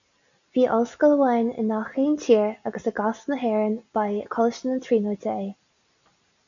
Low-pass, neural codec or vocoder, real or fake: 7.2 kHz; none; real